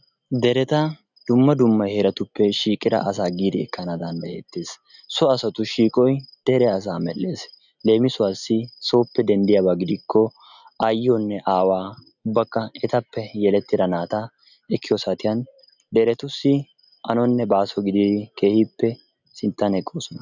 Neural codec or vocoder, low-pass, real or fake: none; 7.2 kHz; real